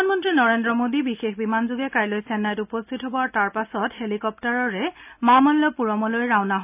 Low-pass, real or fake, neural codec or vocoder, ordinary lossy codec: 3.6 kHz; real; none; none